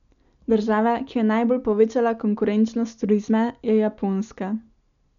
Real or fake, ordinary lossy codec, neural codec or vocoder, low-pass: real; none; none; 7.2 kHz